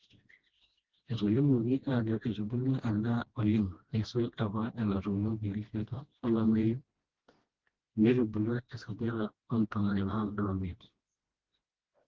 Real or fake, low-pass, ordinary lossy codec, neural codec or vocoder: fake; 7.2 kHz; Opus, 16 kbps; codec, 16 kHz, 1 kbps, FreqCodec, smaller model